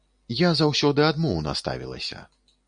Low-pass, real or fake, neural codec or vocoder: 9.9 kHz; real; none